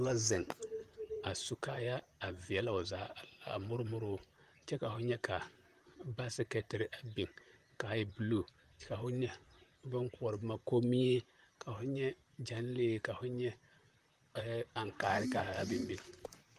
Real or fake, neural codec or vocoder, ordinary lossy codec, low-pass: fake; vocoder, 44.1 kHz, 128 mel bands, Pupu-Vocoder; Opus, 32 kbps; 14.4 kHz